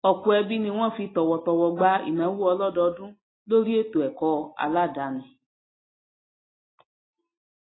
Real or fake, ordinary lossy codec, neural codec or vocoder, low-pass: real; AAC, 16 kbps; none; 7.2 kHz